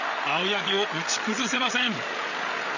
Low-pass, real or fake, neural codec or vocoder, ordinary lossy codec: 7.2 kHz; fake; codec, 16 kHz, 8 kbps, FreqCodec, larger model; none